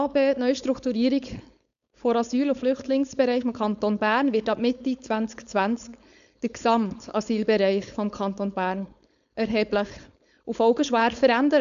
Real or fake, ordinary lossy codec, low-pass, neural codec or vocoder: fake; none; 7.2 kHz; codec, 16 kHz, 4.8 kbps, FACodec